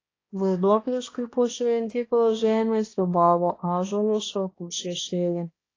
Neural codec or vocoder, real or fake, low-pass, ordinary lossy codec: codec, 16 kHz, 1 kbps, X-Codec, HuBERT features, trained on balanced general audio; fake; 7.2 kHz; AAC, 32 kbps